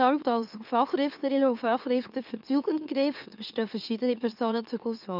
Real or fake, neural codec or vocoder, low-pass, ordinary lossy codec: fake; autoencoder, 44.1 kHz, a latent of 192 numbers a frame, MeloTTS; 5.4 kHz; none